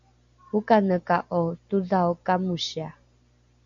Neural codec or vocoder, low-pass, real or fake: none; 7.2 kHz; real